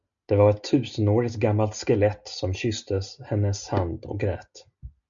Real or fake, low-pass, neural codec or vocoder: real; 7.2 kHz; none